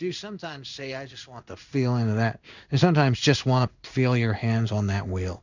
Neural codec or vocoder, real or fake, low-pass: codec, 16 kHz in and 24 kHz out, 1 kbps, XY-Tokenizer; fake; 7.2 kHz